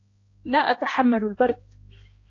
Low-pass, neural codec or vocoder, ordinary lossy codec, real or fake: 7.2 kHz; codec, 16 kHz, 1 kbps, X-Codec, HuBERT features, trained on balanced general audio; AAC, 32 kbps; fake